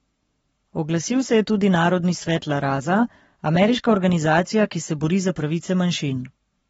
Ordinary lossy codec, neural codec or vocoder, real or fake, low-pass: AAC, 24 kbps; codec, 44.1 kHz, 7.8 kbps, Pupu-Codec; fake; 19.8 kHz